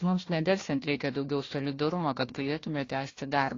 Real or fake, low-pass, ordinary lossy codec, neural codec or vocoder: fake; 7.2 kHz; AAC, 32 kbps; codec, 16 kHz, 1 kbps, FunCodec, trained on Chinese and English, 50 frames a second